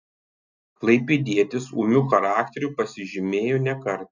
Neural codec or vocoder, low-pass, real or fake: none; 7.2 kHz; real